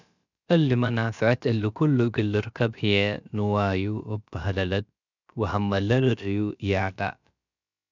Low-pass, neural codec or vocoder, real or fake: 7.2 kHz; codec, 16 kHz, about 1 kbps, DyCAST, with the encoder's durations; fake